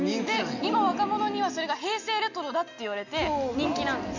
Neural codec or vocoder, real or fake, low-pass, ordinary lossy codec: none; real; 7.2 kHz; none